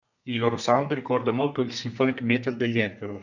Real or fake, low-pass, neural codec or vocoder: fake; 7.2 kHz; codec, 32 kHz, 1.9 kbps, SNAC